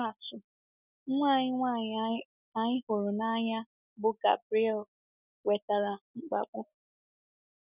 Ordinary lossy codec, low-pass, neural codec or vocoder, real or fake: none; 3.6 kHz; none; real